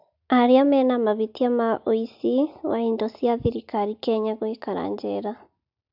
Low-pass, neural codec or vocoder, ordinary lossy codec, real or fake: 5.4 kHz; none; AAC, 48 kbps; real